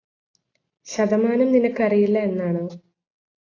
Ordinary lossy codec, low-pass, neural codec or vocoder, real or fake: AAC, 32 kbps; 7.2 kHz; none; real